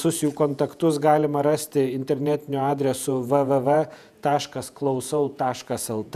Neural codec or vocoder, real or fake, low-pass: vocoder, 48 kHz, 128 mel bands, Vocos; fake; 14.4 kHz